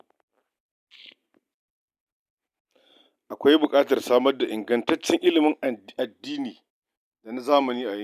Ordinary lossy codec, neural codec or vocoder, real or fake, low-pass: none; none; real; 14.4 kHz